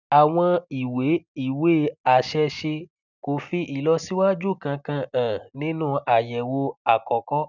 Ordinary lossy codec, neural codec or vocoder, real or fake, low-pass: none; none; real; 7.2 kHz